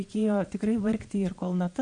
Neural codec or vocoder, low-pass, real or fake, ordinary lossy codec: vocoder, 22.05 kHz, 80 mel bands, Vocos; 9.9 kHz; fake; MP3, 48 kbps